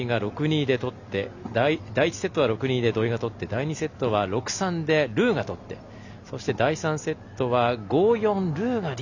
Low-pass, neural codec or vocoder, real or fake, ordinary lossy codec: 7.2 kHz; none; real; none